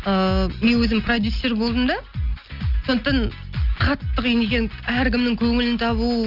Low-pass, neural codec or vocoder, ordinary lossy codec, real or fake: 5.4 kHz; none; Opus, 24 kbps; real